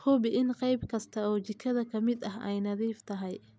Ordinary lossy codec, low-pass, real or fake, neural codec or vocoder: none; none; real; none